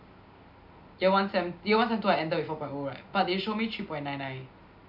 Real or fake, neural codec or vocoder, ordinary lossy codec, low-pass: real; none; none; 5.4 kHz